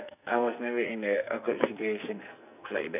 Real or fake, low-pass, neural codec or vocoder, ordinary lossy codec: fake; 3.6 kHz; codec, 44.1 kHz, 2.6 kbps, SNAC; none